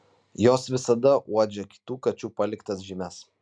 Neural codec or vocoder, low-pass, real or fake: none; 9.9 kHz; real